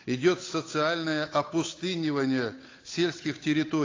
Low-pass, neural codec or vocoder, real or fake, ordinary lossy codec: 7.2 kHz; codec, 16 kHz, 8 kbps, FunCodec, trained on Chinese and English, 25 frames a second; fake; AAC, 32 kbps